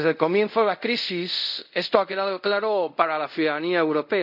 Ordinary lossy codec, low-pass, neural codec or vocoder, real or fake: none; 5.4 kHz; codec, 24 kHz, 0.5 kbps, DualCodec; fake